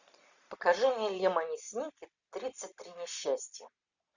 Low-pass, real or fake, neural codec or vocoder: 7.2 kHz; real; none